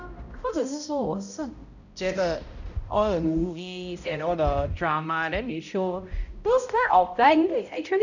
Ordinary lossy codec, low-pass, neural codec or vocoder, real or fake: none; 7.2 kHz; codec, 16 kHz, 0.5 kbps, X-Codec, HuBERT features, trained on balanced general audio; fake